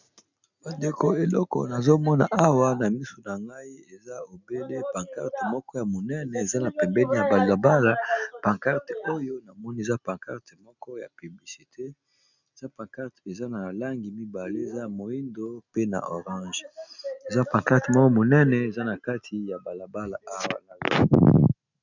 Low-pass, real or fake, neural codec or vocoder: 7.2 kHz; real; none